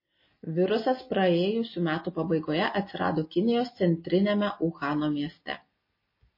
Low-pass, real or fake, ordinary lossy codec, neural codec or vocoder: 5.4 kHz; real; MP3, 24 kbps; none